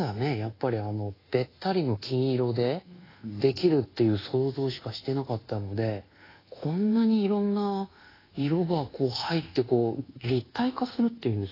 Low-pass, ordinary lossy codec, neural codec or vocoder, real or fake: 5.4 kHz; AAC, 24 kbps; codec, 24 kHz, 1.2 kbps, DualCodec; fake